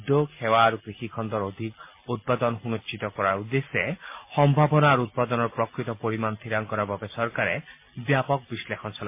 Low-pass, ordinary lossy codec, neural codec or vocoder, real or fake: 3.6 kHz; MP3, 24 kbps; none; real